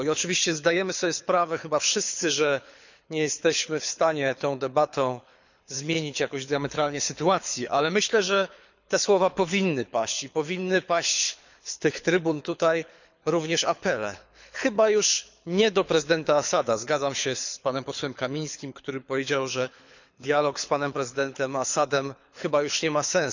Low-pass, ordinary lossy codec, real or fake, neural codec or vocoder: 7.2 kHz; none; fake; codec, 24 kHz, 6 kbps, HILCodec